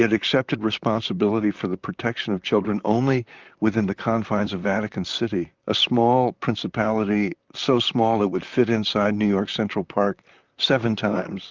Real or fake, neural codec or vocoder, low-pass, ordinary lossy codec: fake; vocoder, 44.1 kHz, 128 mel bands, Pupu-Vocoder; 7.2 kHz; Opus, 24 kbps